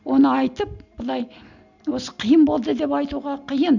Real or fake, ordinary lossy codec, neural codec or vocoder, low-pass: real; none; none; 7.2 kHz